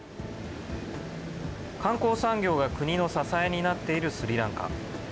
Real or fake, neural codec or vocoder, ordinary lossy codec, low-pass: real; none; none; none